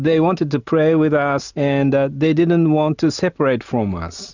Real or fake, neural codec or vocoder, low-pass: real; none; 7.2 kHz